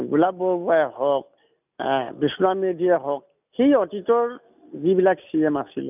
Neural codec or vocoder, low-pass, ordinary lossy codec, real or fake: none; 3.6 kHz; none; real